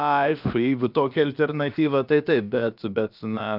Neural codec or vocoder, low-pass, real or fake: codec, 16 kHz, about 1 kbps, DyCAST, with the encoder's durations; 5.4 kHz; fake